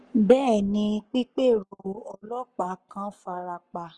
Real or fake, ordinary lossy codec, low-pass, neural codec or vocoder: fake; Opus, 24 kbps; 10.8 kHz; codec, 44.1 kHz, 7.8 kbps, Pupu-Codec